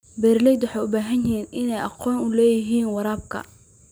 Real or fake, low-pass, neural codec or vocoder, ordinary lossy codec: real; none; none; none